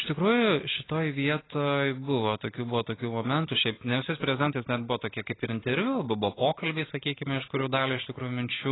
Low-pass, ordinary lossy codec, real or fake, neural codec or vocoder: 7.2 kHz; AAC, 16 kbps; real; none